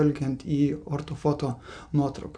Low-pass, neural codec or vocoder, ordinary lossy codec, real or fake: 9.9 kHz; none; MP3, 64 kbps; real